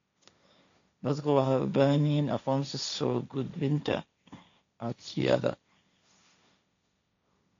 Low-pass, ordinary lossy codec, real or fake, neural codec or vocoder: 7.2 kHz; MP3, 64 kbps; fake; codec, 16 kHz, 1.1 kbps, Voila-Tokenizer